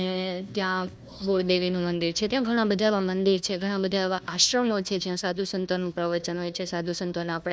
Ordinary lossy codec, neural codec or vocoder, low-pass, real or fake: none; codec, 16 kHz, 1 kbps, FunCodec, trained on Chinese and English, 50 frames a second; none; fake